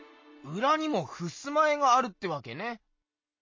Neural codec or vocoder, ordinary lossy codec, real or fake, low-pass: none; MP3, 32 kbps; real; 7.2 kHz